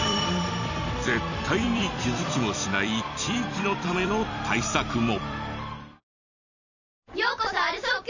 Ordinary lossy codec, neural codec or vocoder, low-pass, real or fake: none; vocoder, 44.1 kHz, 128 mel bands every 256 samples, BigVGAN v2; 7.2 kHz; fake